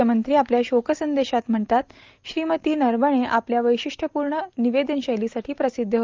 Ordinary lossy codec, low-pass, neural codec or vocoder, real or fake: Opus, 32 kbps; 7.2 kHz; none; real